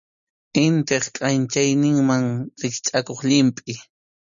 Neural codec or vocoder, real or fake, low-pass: none; real; 7.2 kHz